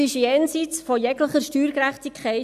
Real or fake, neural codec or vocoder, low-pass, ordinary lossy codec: real; none; 14.4 kHz; none